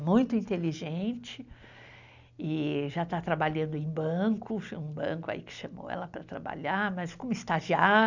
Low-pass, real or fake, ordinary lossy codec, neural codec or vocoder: 7.2 kHz; real; none; none